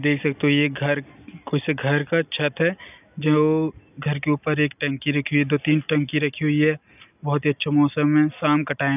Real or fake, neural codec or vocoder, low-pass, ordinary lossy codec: real; none; 3.6 kHz; none